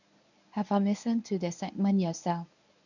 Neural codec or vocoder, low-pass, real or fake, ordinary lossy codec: codec, 24 kHz, 0.9 kbps, WavTokenizer, medium speech release version 1; 7.2 kHz; fake; none